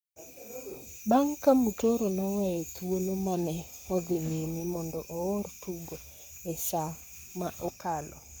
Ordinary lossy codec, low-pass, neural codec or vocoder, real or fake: none; none; codec, 44.1 kHz, 7.8 kbps, Pupu-Codec; fake